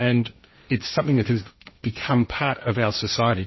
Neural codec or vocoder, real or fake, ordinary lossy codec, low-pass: codec, 16 kHz, 1.1 kbps, Voila-Tokenizer; fake; MP3, 24 kbps; 7.2 kHz